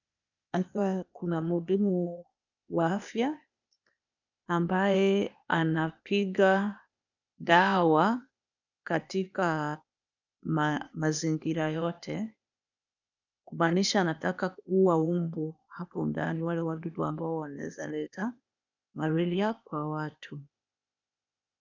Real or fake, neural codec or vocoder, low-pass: fake; codec, 16 kHz, 0.8 kbps, ZipCodec; 7.2 kHz